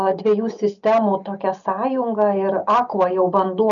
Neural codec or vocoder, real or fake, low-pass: none; real; 7.2 kHz